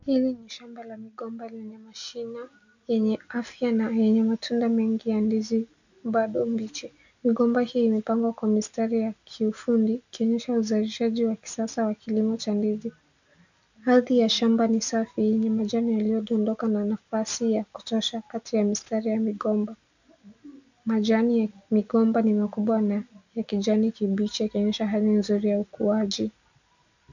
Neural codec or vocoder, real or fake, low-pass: none; real; 7.2 kHz